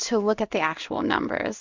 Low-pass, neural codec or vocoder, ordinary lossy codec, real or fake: 7.2 kHz; codec, 16 kHz in and 24 kHz out, 1 kbps, XY-Tokenizer; MP3, 64 kbps; fake